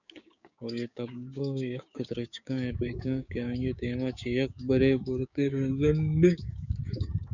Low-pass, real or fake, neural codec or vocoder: 7.2 kHz; fake; codec, 16 kHz, 6 kbps, DAC